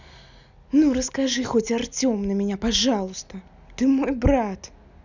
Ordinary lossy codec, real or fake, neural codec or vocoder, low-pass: none; real; none; 7.2 kHz